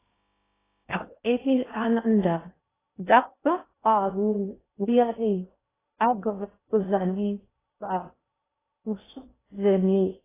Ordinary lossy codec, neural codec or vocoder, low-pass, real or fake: AAC, 16 kbps; codec, 16 kHz in and 24 kHz out, 0.6 kbps, FocalCodec, streaming, 2048 codes; 3.6 kHz; fake